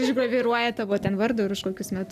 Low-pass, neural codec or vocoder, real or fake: 14.4 kHz; none; real